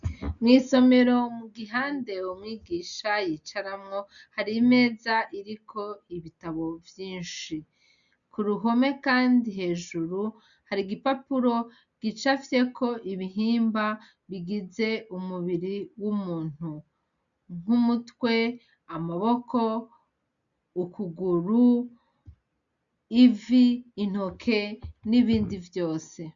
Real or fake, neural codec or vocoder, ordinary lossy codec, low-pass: real; none; MP3, 96 kbps; 7.2 kHz